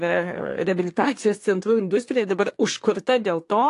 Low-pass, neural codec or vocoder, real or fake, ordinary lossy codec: 10.8 kHz; codec, 24 kHz, 1 kbps, SNAC; fake; AAC, 48 kbps